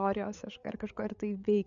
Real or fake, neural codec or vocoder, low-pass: fake; codec, 16 kHz, 8 kbps, FreqCodec, larger model; 7.2 kHz